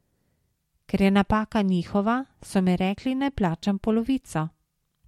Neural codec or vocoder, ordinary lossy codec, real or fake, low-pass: none; MP3, 64 kbps; real; 19.8 kHz